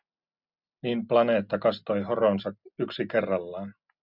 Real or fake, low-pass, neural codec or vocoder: real; 5.4 kHz; none